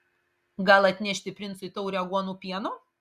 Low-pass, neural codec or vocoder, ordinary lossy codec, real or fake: 14.4 kHz; none; Opus, 64 kbps; real